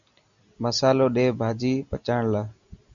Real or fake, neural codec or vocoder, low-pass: real; none; 7.2 kHz